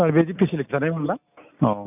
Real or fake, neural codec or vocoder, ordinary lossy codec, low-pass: real; none; none; 3.6 kHz